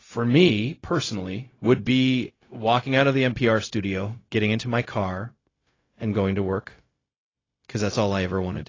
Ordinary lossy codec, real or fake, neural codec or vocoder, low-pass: AAC, 32 kbps; fake; codec, 16 kHz, 0.4 kbps, LongCat-Audio-Codec; 7.2 kHz